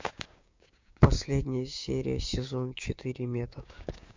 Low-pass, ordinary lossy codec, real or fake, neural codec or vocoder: 7.2 kHz; MP3, 48 kbps; fake; codec, 16 kHz, 6 kbps, DAC